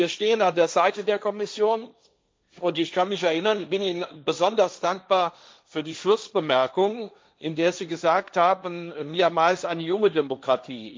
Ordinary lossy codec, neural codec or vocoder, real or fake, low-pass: none; codec, 16 kHz, 1.1 kbps, Voila-Tokenizer; fake; none